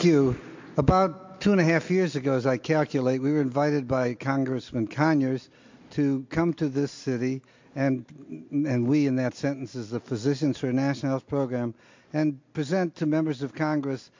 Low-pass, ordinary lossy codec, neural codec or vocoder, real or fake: 7.2 kHz; MP3, 48 kbps; none; real